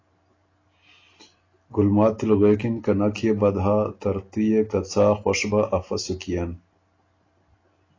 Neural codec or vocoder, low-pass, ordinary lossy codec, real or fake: none; 7.2 kHz; AAC, 32 kbps; real